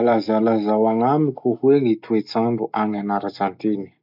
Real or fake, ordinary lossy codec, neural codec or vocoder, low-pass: real; none; none; 5.4 kHz